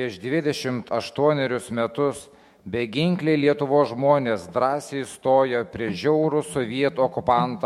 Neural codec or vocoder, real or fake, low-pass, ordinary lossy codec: autoencoder, 48 kHz, 128 numbers a frame, DAC-VAE, trained on Japanese speech; fake; 19.8 kHz; MP3, 64 kbps